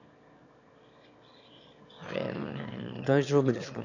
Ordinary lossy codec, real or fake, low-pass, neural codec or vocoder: none; fake; 7.2 kHz; autoencoder, 22.05 kHz, a latent of 192 numbers a frame, VITS, trained on one speaker